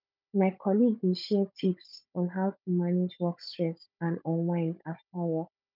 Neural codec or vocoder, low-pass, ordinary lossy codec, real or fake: codec, 16 kHz, 16 kbps, FunCodec, trained on Chinese and English, 50 frames a second; 5.4 kHz; none; fake